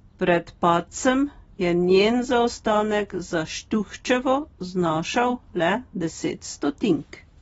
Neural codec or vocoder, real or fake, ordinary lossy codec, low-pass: none; real; AAC, 24 kbps; 19.8 kHz